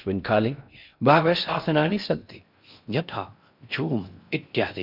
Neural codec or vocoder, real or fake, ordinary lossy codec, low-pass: codec, 16 kHz in and 24 kHz out, 0.6 kbps, FocalCodec, streaming, 4096 codes; fake; none; 5.4 kHz